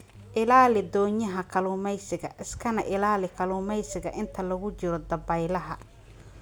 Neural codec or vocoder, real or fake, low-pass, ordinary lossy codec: none; real; none; none